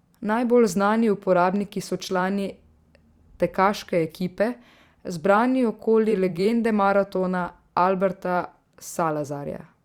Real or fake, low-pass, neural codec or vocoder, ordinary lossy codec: fake; 19.8 kHz; vocoder, 44.1 kHz, 128 mel bands every 512 samples, BigVGAN v2; Opus, 64 kbps